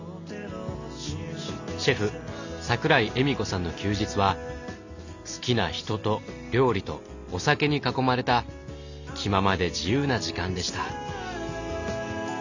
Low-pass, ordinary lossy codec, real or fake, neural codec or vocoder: 7.2 kHz; none; real; none